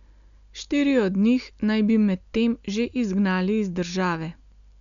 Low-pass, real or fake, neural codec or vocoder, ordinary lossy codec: 7.2 kHz; real; none; none